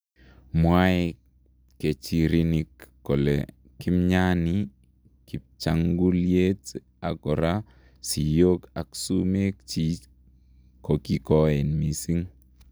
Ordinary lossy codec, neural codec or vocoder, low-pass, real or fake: none; none; none; real